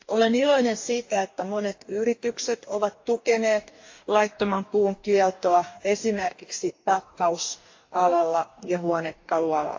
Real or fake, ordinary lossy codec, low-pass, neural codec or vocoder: fake; AAC, 48 kbps; 7.2 kHz; codec, 44.1 kHz, 2.6 kbps, DAC